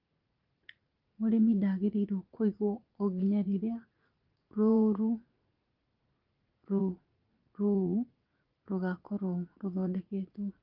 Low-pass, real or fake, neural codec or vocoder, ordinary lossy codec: 5.4 kHz; fake; vocoder, 44.1 kHz, 80 mel bands, Vocos; Opus, 32 kbps